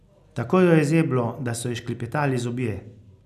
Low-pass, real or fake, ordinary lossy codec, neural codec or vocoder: 14.4 kHz; real; none; none